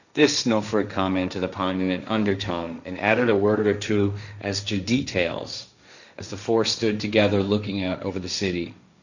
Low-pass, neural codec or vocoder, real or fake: 7.2 kHz; codec, 16 kHz, 1.1 kbps, Voila-Tokenizer; fake